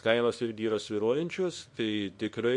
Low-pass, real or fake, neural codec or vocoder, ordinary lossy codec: 10.8 kHz; fake; codec, 24 kHz, 0.9 kbps, WavTokenizer, small release; MP3, 48 kbps